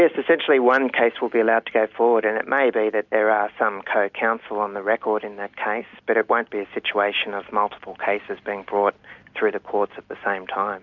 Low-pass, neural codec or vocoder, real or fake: 7.2 kHz; none; real